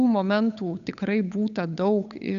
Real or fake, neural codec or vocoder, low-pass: fake; codec, 16 kHz, 8 kbps, FunCodec, trained on Chinese and English, 25 frames a second; 7.2 kHz